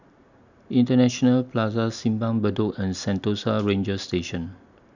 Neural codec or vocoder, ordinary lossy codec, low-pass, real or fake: none; none; 7.2 kHz; real